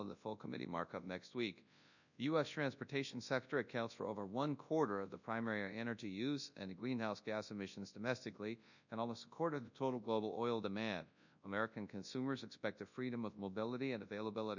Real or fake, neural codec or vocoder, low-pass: fake; codec, 24 kHz, 0.9 kbps, WavTokenizer, large speech release; 7.2 kHz